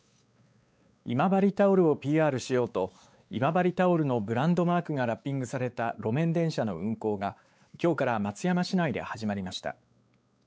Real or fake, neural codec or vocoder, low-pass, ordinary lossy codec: fake; codec, 16 kHz, 4 kbps, X-Codec, WavLM features, trained on Multilingual LibriSpeech; none; none